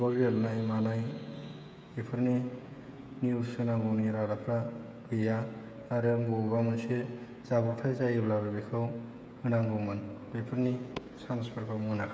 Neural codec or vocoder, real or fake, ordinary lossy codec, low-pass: codec, 16 kHz, 16 kbps, FreqCodec, smaller model; fake; none; none